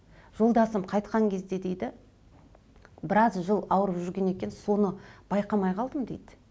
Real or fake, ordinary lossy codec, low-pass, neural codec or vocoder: real; none; none; none